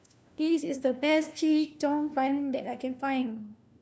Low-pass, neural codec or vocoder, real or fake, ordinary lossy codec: none; codec, 16 kHz, 1 kbps, FunCodec, trained on LibriTTS, 50 frames a second; fake; none